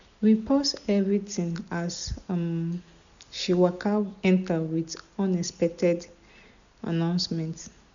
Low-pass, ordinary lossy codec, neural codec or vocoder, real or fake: 7.2 kHz; none; none; real